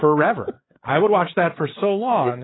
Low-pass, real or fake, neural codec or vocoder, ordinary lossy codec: 7.2 kHz; fake; codec, 16 kHz, 8 kbps, FreqCodec, larger model; AAC, 16 kbps